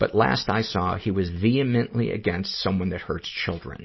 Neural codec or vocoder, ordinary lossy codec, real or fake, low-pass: none; MP3, 24 kbps; real; 7.2 kHz